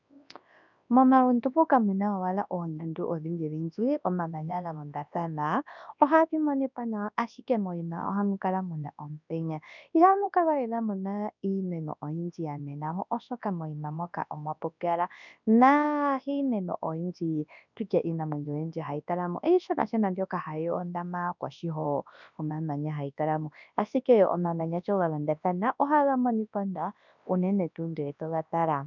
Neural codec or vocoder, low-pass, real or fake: codec, 24 kHz, 0.9 kbps, WavTokenizer, large speech release; 7.2 kHz; fake